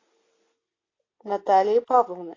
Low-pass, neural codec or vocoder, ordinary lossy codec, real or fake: 7.2 kHz; none; AAC, 32 kbps; real